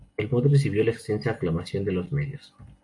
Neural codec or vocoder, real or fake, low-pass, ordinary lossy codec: none; real; 10.8 kHz; MP3, 48 kbps